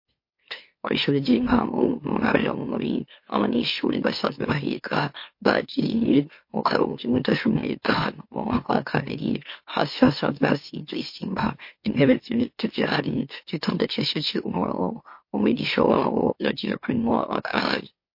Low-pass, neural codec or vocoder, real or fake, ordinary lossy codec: 5.4 kHz; autoencoder, 44.1 kHz, a latent of 192 numbers a frame, MeloTTS; fake; MP3, 32 kbps